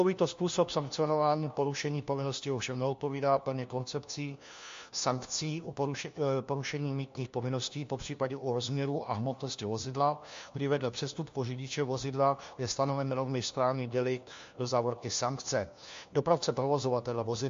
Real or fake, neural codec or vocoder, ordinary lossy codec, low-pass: fake; codec, 16 kHz, 1 kbps, FunCodec, trained on LibriTTS, 50 frames a second; MP3, 48 kbps; 7.2 kHz